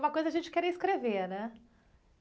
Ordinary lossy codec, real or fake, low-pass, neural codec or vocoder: none; real; none; none